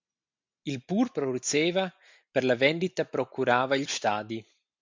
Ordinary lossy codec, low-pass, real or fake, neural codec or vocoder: MP3, 64 kbps; 7.2 kHz; real; none